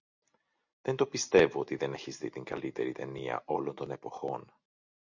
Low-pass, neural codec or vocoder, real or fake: 7.2 kHz; none; real